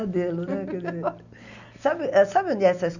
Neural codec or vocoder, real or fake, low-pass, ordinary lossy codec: none; real; 7.2 kHz; none